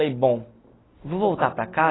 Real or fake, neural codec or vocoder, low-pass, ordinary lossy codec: real; none; 7.2 kHz; AAC, 16 kbps